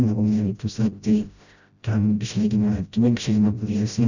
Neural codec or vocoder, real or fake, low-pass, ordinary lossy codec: codec, 16 kHz, 0.5 kbps, FreqCodec, smaller model; fake; 7.2 kHz; none